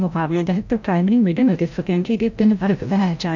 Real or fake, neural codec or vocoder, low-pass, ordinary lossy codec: fake; codec, 16 kHz, 0.5 kbps, FreqCodec, larger model; 7.2 kHz; none